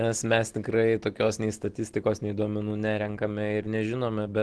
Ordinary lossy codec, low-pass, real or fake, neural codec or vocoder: Opus, 16 kbps; 10.8 kHz; real; none